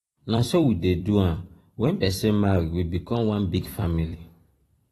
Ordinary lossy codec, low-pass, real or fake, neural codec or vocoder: AAC, 32 kbps; 19.8 kHz; fake; vocoder, 44.1 kHz, 128 mel bands every 256 samples, BigVGAN v2